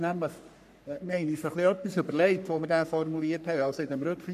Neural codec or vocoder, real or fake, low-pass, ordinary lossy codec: codec, 44.1 kHz, 3.4 kbps, Pupu-Codec; fake; 14.4 kHz; none